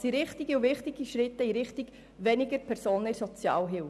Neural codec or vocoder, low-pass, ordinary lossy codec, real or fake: none; none; none; real